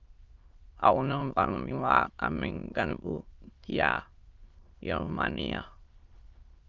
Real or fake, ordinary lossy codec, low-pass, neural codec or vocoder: fake; Opus, 24 kbps; 7.2 kHz; autoencoder, 22.05 kHz, a latent of 192 numbers a frame, VITS, trained on many speakers